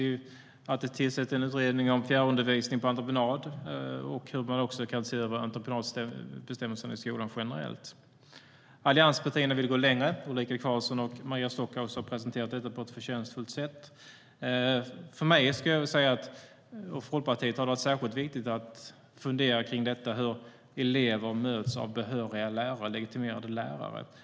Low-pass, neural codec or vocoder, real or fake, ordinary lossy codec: none; none; real; none